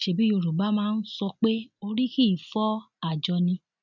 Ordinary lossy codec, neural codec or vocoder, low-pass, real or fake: none; none; 7.2 kHz; real